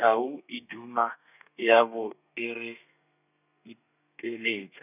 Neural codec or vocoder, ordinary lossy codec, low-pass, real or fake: codec, 32 kHz, 1.9 kbps, SNAC; none; 3.6 kHz; fake